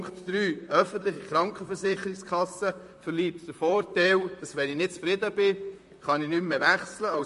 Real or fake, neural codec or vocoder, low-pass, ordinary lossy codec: fake; vocoder, 44.1 kHz, 128 mel bands, Pupu-Vocoder; 14.4 kHz; MP3, 48 kbps